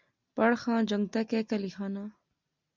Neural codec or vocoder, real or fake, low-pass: none; real; 7.2 kHz